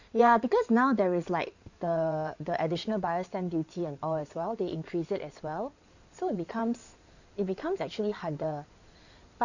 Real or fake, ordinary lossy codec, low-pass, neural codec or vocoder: fake; none; 7.2 kHz; codec, 16 kHz in and 24 kHz out, 2.2 kbps, FireRedTTS-2 codec